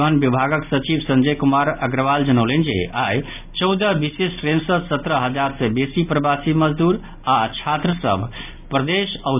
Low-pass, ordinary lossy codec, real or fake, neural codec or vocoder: 3.6 kHz; none; real; none